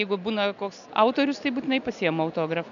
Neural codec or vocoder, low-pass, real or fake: none; 7.2 kHz; real